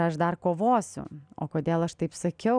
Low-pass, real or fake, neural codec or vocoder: 9.9 kHz; real; none